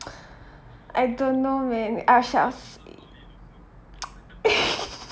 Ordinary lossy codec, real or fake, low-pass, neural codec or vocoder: none; real; none; none